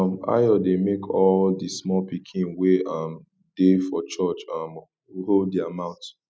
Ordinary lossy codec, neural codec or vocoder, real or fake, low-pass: none; none; real; none